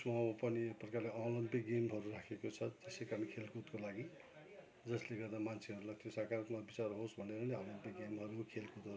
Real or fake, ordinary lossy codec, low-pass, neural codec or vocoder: real; none; none; none